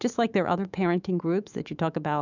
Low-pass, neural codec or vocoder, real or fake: 7.2 kHz; autoencoder, 48 kHz, 128 numbers a frame, DAC-VAE, trained on Japanese speech; fake